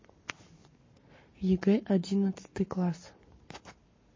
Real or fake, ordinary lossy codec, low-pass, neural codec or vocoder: fake; MP3, 32 kbps; 7.2 kHz; codec, 44.1 kHz, 7.8 kbps, Pupu-Codec